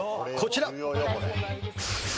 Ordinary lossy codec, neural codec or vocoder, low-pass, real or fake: none; none; none; real